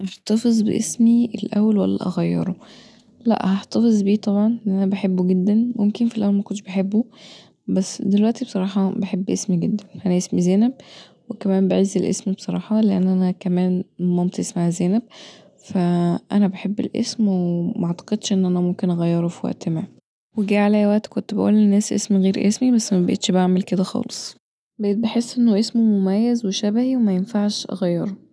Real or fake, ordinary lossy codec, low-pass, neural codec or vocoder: real; none; none; none